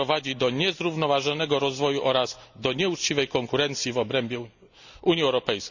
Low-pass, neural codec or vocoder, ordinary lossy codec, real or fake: 7.2 kHz; none; none; real